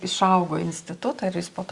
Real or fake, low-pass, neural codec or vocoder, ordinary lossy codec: real; 10.8 kHz; none; Opus, 64 kbps